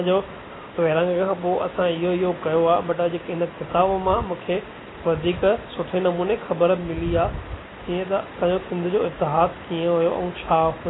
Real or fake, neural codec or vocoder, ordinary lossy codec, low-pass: real; none; AAC, 16 kbps; 7.2 kHz